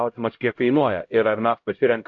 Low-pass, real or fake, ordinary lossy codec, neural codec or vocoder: 7.2 kHz; fake; AAC, 48 kbps; codec, 16 kHz, 0.5 kbps, X-Codec, HuBERT features, trained on LibriSpeech